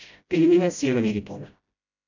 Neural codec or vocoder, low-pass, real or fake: codec, 16 kHz, 0.5 kbps, FreqCodec, smaller model; 7.2 kHz; fake